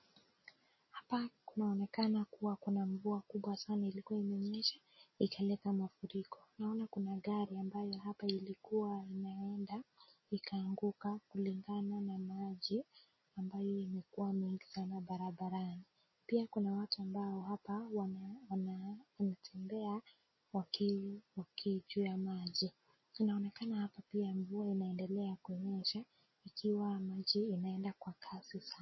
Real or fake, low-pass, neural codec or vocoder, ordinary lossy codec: real; 7.2 kHz; none; MP3, 24 kbps